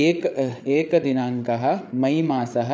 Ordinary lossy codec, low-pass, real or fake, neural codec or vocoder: none; none; fake; codec, 16 kHz, 8 kbps, FreqCodec, larger model